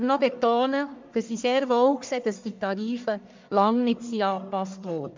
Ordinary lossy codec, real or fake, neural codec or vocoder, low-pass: MP3, 64 kbps; fake; codec, 44.1 kHz, 1.7 kbps, Pupu-Codec; 7.2 kHz